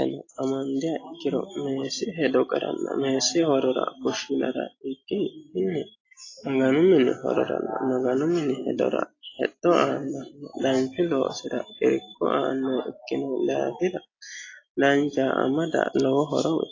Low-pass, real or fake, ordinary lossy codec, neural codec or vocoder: 7.2 kHz; real; AAC, 32 kbps; none